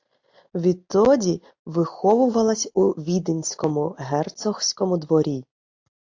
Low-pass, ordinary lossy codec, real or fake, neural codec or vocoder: 7.2 kHz; AAC, 48 kbps; real; none